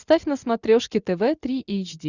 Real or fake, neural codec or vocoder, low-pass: real; none; 7.2 kHz